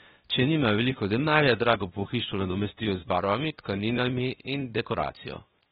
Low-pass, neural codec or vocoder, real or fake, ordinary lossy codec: 7.2 kHz; codec, 16 kHz, 0.8 kbps, ZipCodec; fake; AAC, 16 kbps